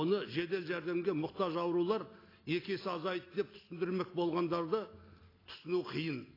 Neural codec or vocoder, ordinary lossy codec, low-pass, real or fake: none; AAC, 24 kbps; 5.4 kHz; real